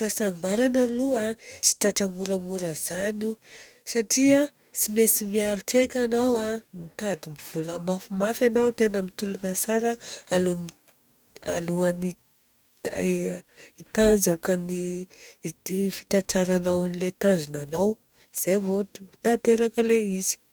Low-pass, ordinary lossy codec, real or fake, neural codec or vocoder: none; none; fake; codec, 44.1 kHz, 2.6 kbps, DAC